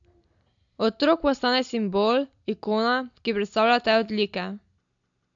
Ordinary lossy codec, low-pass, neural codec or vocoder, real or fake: AAC, 64 kbps; 7.2 kHz; none; real